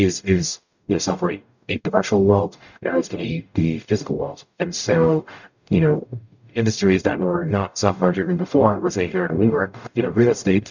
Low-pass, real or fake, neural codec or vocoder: 7.2 kHz; fake; codec, 44.1 kHz, 0.9 kbps, DAC